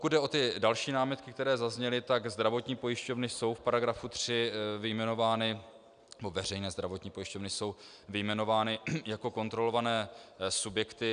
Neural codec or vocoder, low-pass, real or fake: none; 9.9 kHz; real